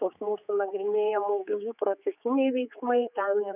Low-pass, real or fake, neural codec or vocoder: 3.6 kHz; fake; codec, 16 kHz, 4 kbps, X-Codec, HuBERT features, trained on general audio